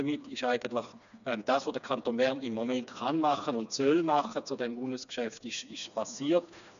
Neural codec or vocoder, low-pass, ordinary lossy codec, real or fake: codec, 16 kHz, 2 kbps, FreqCodec, smaller model; 7.2 kHz; none; fake